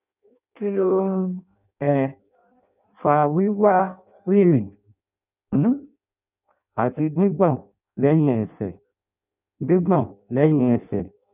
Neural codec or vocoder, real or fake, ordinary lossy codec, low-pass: codec, 16 kHz in and 24 kHz out, 0.6 kbps, FireRedTTS-2 codec; fake; none; 3.6 kHz